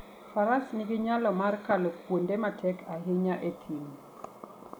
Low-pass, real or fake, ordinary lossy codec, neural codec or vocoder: none; real; none; none